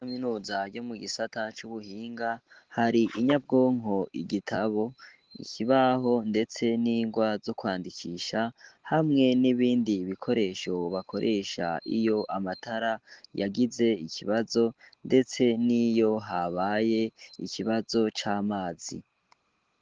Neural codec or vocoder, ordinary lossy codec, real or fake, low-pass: none; Opus, 16 kbps; real; 7.2 kHz